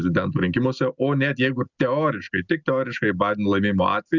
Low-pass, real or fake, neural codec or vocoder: 7.2 kHz; real; none